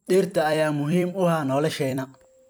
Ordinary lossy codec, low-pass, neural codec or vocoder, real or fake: none; none; vocoder, 44.1 kHz, 128 mel bands every 256 samples, BigVGAN v2; fake